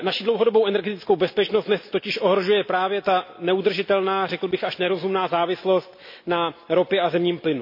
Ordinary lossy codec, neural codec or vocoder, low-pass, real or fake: MP3, 32 kbps; none; 5.4 kHz; real